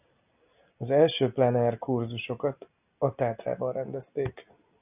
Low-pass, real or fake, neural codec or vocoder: 3.6 kHz; real; none